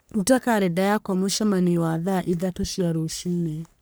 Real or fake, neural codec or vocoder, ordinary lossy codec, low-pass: fake; codec, 44.1 kHz, 3.4 kbps, Pupu-Codec; none; none